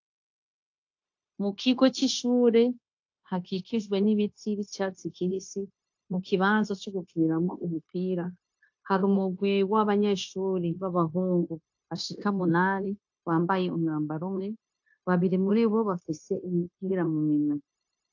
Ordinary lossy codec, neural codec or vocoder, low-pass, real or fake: AAC, 48 kbps; codec, 16 kHz, 0.9 kbps, LongCat-Audio-Codec; 7.2 kHz; fake